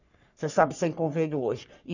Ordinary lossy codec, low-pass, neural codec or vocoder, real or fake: none; 7.2 kHz; codec, 44.1 kHz, 3.4 kbps, Pupu-Codec; fake